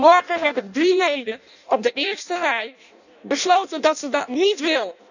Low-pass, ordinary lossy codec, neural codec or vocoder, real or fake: 7.2 kHz; none; codec, 16 kHz in and 24 kHz out, 0.6 kbps, FireRedTTS-2 codec; fake